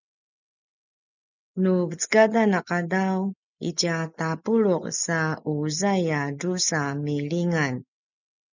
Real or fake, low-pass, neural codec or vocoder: real; 7.2 kHz; none